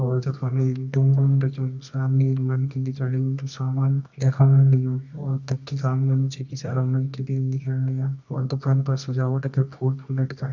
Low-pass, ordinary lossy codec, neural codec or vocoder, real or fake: 7.2 kHz; none; codec, 24 kHz, 0.9 kbps, WavTokenizer, medium music audio release; fake